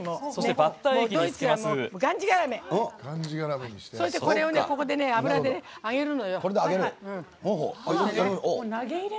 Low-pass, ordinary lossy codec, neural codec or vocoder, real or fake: none; none; none; real